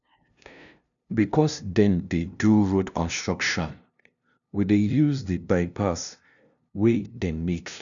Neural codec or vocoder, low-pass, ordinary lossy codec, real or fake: codec, 16 kHz, 0.5 kbps, FunCodec, trained on LibriTTS, 25 frames a second; 7.2 kHz; none; fake